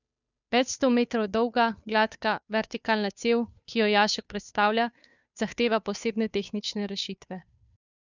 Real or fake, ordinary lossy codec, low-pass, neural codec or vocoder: fake; none; 7.2 kHz; codec, 16 kHz, 2 kbps, FunCodec, trained on Chinese and English, 25 frames a second